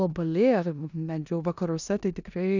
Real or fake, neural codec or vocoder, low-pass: fake; codec, 16 kHz in and 24 kHz out, 0.9 kbps, LongCat-Audio-Codec, four codebook decoder; 7.2 kHz